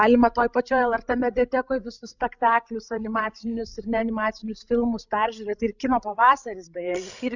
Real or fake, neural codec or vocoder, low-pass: fake; codec, 16 kHz, 16 kbps, FreqCodec, larger model; 7.2 kHz